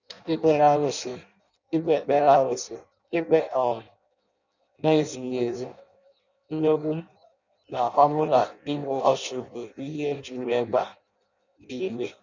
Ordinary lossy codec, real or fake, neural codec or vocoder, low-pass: none; fake; codec, 16 kHz in and 24 kHz out, 0.6 kbps, FireRedTTS-2 codec; 7.2 kHz